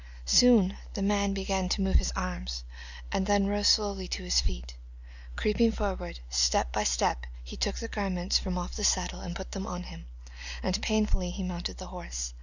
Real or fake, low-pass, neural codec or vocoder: real; 7.2 kHz; none